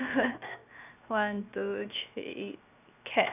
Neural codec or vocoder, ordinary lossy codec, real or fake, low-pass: codec, 16 kHz, 0.7 kbps, FocalCodec; none; fake; 3.6 kHz